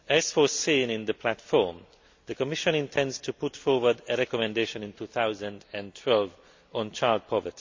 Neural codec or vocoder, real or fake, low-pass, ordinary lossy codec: none; real; 7.2 kHz; MP3, 64 kbps